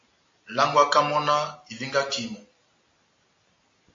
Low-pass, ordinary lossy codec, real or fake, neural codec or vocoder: 7.2 kHz; AAC, 48 kbps; real; none